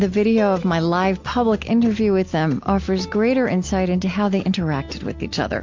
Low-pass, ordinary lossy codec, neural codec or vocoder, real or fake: 7.2 kHz; MP3, 48 kbps; none; real